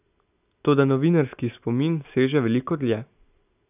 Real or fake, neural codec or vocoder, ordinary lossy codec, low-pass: real; none; none; 3.6 kHz